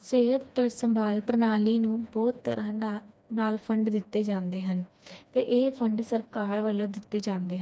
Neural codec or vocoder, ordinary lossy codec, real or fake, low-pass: codec, 16 kHz, 2 kbps, FreqCodec, smaller model; none; fake; none